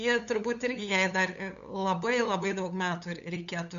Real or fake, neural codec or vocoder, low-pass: fake; codec, 16 kHz, 8 kbps, FunCodec, trained on LibriTTS, 25 frames a second; 7.2 kHz